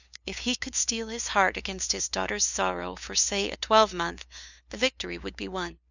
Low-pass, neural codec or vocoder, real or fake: 7.2 kHz; codec, 16 kHz, 2 kbps, FunCodec, trained on LibriTTS, 25 frames a second; fake